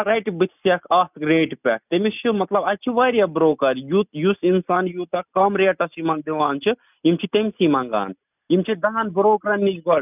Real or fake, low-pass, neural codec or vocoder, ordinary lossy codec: real; 3.6 kHz; none; none